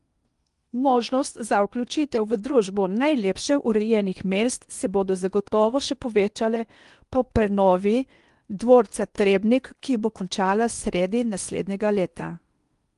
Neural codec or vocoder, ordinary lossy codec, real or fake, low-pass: codec, 16 kHz in and 24 kHz out, 0.8 kbps, FocalCodec, streaming, 65536 codes; Opus, 32 kbps; fake; 10.8 kHz